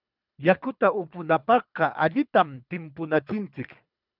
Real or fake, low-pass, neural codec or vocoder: fake; 5.4 kHz; codec, 24 kHz, 3 kbps, HILCodec